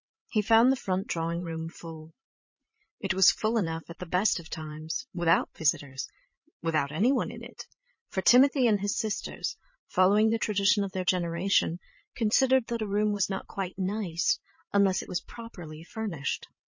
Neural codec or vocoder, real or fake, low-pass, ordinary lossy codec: vocoder, 22.05 kHz, 80 mel bands, Vocos; fake; 7.2 kHz; MP3, 32 kbps